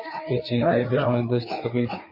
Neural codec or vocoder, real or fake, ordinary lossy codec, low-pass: codec, 16 kHz, 4 kbps, FreqCodec, larger model; fake; MP3, 24 kbps; 5.4 kHz